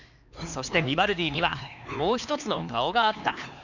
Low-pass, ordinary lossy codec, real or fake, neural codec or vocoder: 7.2 kHz; none; fake; codec, 16 kHz, 2 kbps, X-Codec, HuBERT features, trained on LibriSpeech